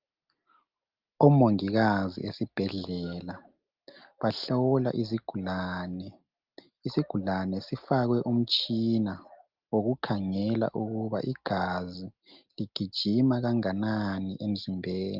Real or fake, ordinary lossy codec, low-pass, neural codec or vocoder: real; Opus, 32 kbps; 5.4 kHz; none